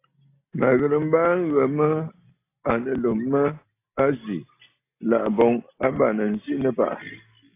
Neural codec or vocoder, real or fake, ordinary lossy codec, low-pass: none; real; AAC, 24 kbps; 3.6 kHz